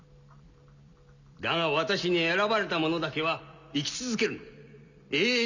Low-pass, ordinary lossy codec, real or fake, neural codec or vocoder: 7.2 kHz; none; real; none